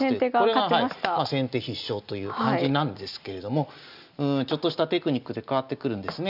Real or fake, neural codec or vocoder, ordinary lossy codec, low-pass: real; none; none; 5.4 kHz